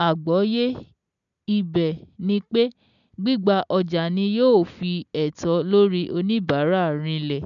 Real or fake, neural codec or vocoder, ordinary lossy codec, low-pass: real; none; none; 7.2 kHz